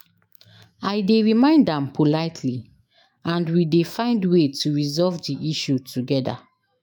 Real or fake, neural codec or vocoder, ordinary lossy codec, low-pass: fake; autoencoder, 48 kHz, 128 numbers a frame, DAC-VAE, trained on Japanese speech; MP3, 96 kbps; 19.8 kHz